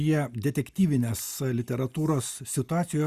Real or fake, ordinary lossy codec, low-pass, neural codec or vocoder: real; Opus, 64 kbps; 14.4 kHz; none